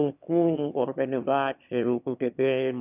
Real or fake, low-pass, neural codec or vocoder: fake; 3.6 kHz; autoencoder, 22.05 kHz, a latent of 192 numbers a frame, VITS, trained on one speaker